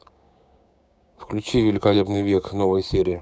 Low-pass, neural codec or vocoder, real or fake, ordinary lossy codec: none; codec, 16 kHz, 6 kbps, DAC; fake; none